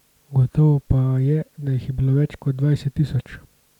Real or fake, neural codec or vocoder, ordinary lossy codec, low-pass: real; none; none; 19.8 kHz